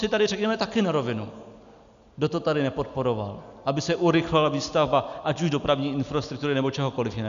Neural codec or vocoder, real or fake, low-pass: none; real; 7.2 kHz